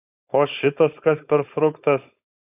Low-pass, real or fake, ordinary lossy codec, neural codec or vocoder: 3.6 kHz; fake; AAC, 32 kbps; codec, 16 kHz, 4.8 kbps, FACodec